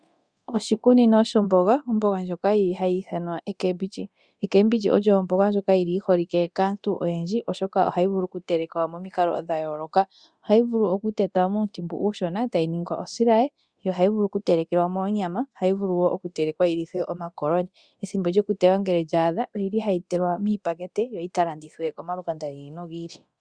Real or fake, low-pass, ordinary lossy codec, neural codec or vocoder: fake; 9.9 kHz; Opus, 64 kbps; codec, 24 kHz, 0.9 kbps, DualCodec